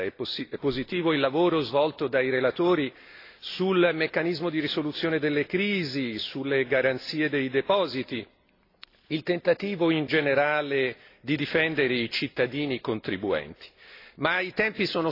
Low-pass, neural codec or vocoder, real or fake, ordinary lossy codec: 5.4 kHz; none; real; AAC, 32 kbps